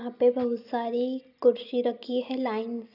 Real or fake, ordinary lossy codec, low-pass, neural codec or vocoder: real; none; 5.4 kHz; none